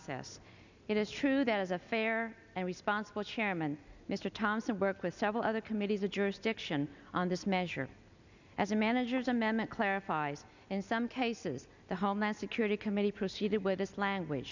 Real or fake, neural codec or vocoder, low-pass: real; none; 7.2 kHz